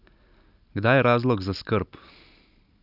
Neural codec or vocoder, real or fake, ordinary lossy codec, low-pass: none; real; none; 5.4 kHz